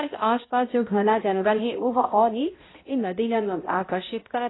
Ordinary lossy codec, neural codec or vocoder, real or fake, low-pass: AAC, 16 kbps; codec, 16 kHz, 0.5 kbps, X-Codec, HuBERT features, trained on balanced general audio; fake; 7.2 kHz